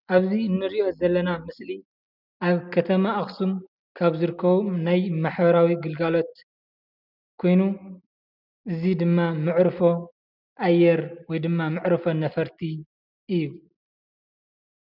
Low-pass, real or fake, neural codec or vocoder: 5.4 kHz; real; none